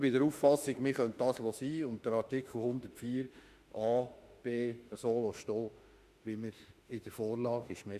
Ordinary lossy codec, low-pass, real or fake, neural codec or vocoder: Opus, 64 kbps; 14.4 kHz; fake; autoencoder, 48 kHz, 32 numbers a frame, DAC-VAE, trained on Japanese speech